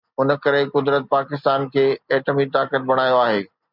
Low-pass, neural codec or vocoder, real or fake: 5.4 kHz; none; real